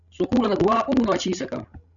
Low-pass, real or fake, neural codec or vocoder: 7.2 kHz; fake; codec, 16 kHz, 16 kbps, FreqCodec, larger model